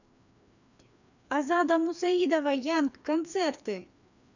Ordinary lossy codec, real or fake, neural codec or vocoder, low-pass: none; fake; codec, 16 kHz, 2 kbps, FreqCodec, larger model; 7.2 kHz